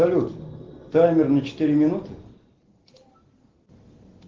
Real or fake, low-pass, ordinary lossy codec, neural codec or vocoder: real; 7.2 kHz; Opus, 16 kbps; none